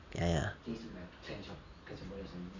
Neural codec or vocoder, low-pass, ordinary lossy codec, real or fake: none; 7.2 kHz; none; real